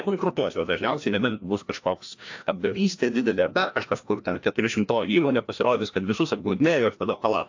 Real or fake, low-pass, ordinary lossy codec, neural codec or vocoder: fake; 7.2 kHz; AAC, 48 kbps; codec, 16 kHz, 1 kbps, FreqCodec, larger model